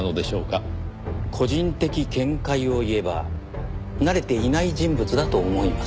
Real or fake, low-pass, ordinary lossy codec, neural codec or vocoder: real; none; none; none